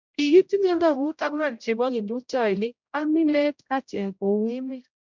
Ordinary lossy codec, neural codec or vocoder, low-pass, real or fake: MP3, 64 kbps; codec, 16 kHz, 0.5 kbps, X-Codec, HuBERT features, trained on general audio; 7.2 kHz; fake